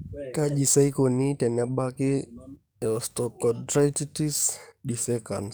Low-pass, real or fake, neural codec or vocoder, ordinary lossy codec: none; fake; codec, 44.1 kHz, 7.8 kbps, DAC; none